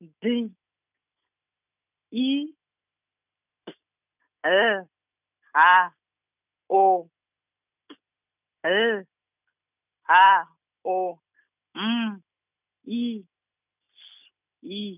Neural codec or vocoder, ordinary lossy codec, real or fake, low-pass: none; none; real; 3.6 kHz